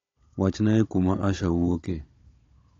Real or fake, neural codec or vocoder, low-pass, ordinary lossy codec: fake; codec, 16 kHz, 16 kbps, FunCodec, trained on Chinese and English, 50 frames a second; 7.2 kHz; AAC, 32 kbps